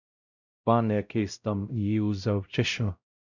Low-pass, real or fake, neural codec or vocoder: 7.2 kHz; fake; codec, 16 kHz, 0.5 kbps, X-Codec, WavLM features, trained on Multilingual LibriSpeech